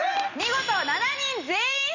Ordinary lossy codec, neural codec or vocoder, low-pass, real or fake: none; vocoder, 44.1 kHz, 128 mel bands every 256 samples, BigVGAN v2; 7.2 kHz; fake